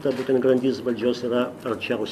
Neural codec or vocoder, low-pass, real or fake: none; 14.4 kHz; real